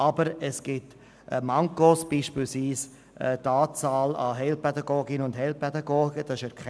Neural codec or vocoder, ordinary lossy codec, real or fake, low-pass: none; none; real; none